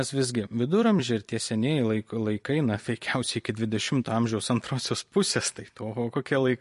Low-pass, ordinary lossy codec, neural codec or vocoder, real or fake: 14.4 kHz; MP3, 48 kbps; vocoder, 44.1 kHz, 128 mel bands every 256 samples, BigVGAN v2; fake